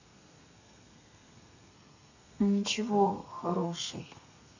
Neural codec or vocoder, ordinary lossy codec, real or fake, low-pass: codec, 32 kHz, 1.9 kbps, SNAC; none; fake; 7.2 kHz